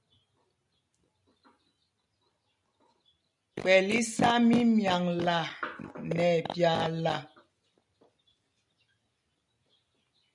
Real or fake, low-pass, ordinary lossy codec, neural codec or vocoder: real; 10.8 kHz; MP3, 96 kbps; none